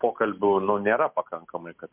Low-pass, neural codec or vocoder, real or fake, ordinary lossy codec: 3.6 kHz; none; real; MP3, 32 kbps